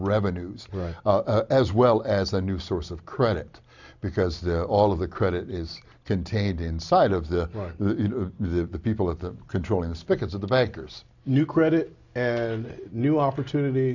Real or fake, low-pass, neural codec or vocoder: real; 7.2 kHz; none